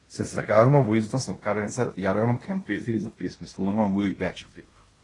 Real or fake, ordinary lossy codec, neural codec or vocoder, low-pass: fake; AAC, 32 kbps; codec, 16 kHz in and 24 kHz out, 0.9 kbps, LongCat-Audio-Codec, fine tuned four codebook decoder; 10.8 kHz